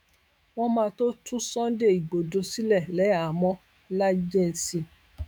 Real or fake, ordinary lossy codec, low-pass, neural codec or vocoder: fake; none; none; autoencoder, 48 kHz, 128 numbers a frame, DAC-VAE, trained on Japanese speech